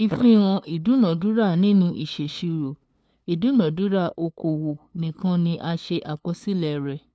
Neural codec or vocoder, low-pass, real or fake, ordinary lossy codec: codec, 16 kHz, 2 kbps, FunCodec, trained on LibriTTS, 25 frames a second; none; fake; none